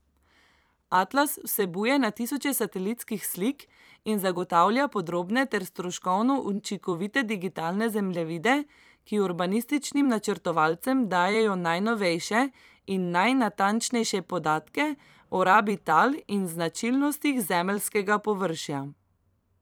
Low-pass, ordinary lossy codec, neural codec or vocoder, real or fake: none; none; vocoder, 44.1 kHz, 128 mel bands every 256 samples, BigVGAN v2; fake